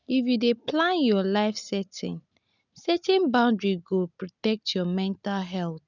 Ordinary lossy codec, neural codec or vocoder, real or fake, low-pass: none; none; real; 7.2 kHz